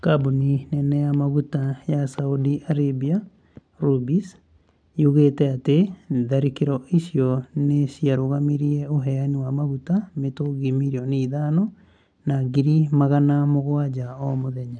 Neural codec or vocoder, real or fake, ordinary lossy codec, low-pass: none; real; none; 9.9 kHz